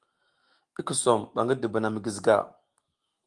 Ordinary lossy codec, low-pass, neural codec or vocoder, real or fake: Opus, 24 kbps; 10.8 kHz; autoencoder, 48 kHz, 128 numbers a frame, DAC-VAE, trained on Japanese speech; fake